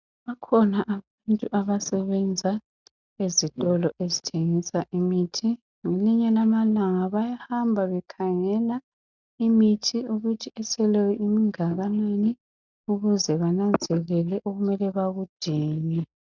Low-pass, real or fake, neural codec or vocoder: 7.2 kHz; real; none